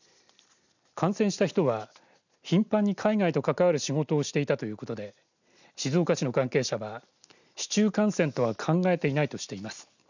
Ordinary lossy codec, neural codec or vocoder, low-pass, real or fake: none; none; 7.2 kHz; real